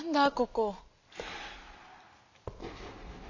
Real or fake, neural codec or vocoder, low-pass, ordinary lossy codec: real; none; 7.2 kHz; none